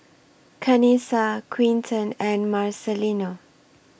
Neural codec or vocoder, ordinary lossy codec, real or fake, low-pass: none; none; real; none